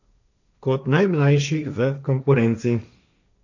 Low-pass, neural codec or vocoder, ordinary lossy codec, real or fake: 7.2 kHz; codec, 16 kHz, 1.1 kbps, Voila-Tokenizer; none; fake